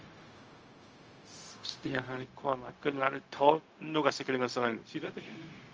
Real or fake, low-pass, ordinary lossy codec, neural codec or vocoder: fake; 7.2 kHz; Opus, 24 kbps; codec, 16 kHz, 0.4 kbps, LongCat-Audio-Codec